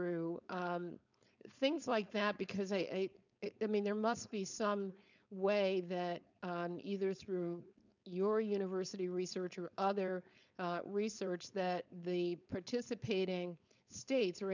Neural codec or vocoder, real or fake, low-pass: codec, 16 kHz, 4.8 kbps, FACodec; fake; 7.2 kHz